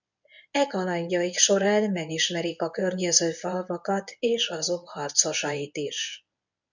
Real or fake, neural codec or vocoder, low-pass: fake; codec, 24 kHz, 0.9 kbps, WavTokenizer, medium speech release version 1; 7.2 kHz